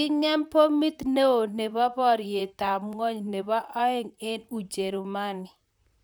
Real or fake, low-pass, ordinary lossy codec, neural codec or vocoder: fake; none; none; vocoder, 44.1 kHz, 128 mel bands, Pupu-Vocoder